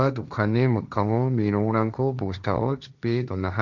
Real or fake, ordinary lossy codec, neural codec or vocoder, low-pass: fake; none; codec, 16 kHz, 1.1 kbps, Voila-Tokenizer; 7.2 kHz